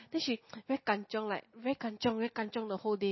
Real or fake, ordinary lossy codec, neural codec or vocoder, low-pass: real; MP3, 24 kbps; none; 7.2 kHz